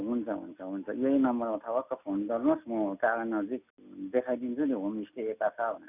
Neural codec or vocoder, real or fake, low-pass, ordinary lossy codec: none; real; 3.6 kHz; none